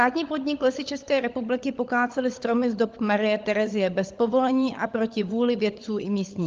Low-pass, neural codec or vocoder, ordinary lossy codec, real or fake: 7.2 kHz; codec, 16 kHz, 16 kbps, FunCodec, trained on LibriTTS, 50 frames a second; Opus, 32 kbps; fake